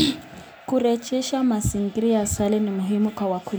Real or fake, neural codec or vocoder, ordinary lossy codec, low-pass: real; none; none; none